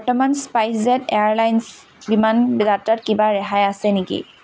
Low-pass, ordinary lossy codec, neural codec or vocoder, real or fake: none; none; none; real